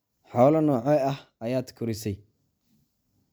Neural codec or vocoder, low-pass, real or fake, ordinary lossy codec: none; none; real; none